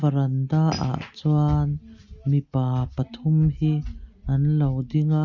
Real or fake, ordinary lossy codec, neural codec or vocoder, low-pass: real; Opus, 64 kbps; none; 7.2 kHz